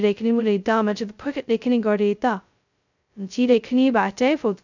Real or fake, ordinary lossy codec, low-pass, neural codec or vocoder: fake; none; 7.2 kHz; codec, 16 kHz, 0.2 kbps, FocalCodec